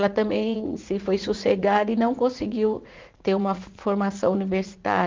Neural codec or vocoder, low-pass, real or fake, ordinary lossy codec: vocoder, 44.1 kHz, 80 mel bands, Vocos; 7.2 kHz; fake; Opus, 24 kbps